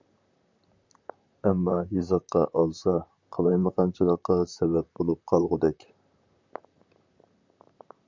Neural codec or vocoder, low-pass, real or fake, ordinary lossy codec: vocoder, 44.1 kHz, 128 mel bands, Pupu-Vocoder; 7.2 kHz; fake; MP3, 64 kbps